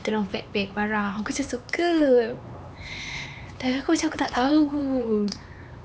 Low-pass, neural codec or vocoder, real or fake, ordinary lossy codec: none; codec, 16 kHz, 4 kbps, X-Codec, HuBERT features, trained on LibriSpeech; fake; none